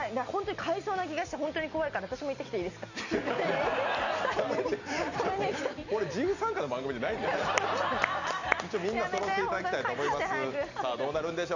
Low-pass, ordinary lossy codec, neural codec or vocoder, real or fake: 7.2 kHz; none; none; real